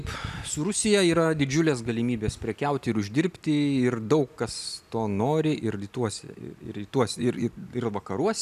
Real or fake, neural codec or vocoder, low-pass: real; none; 14.4 kHz